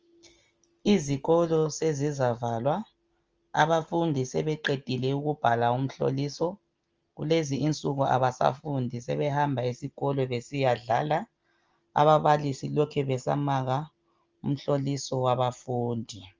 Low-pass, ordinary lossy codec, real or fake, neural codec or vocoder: 7.2 kHz; Opus, 24 kbps; real; none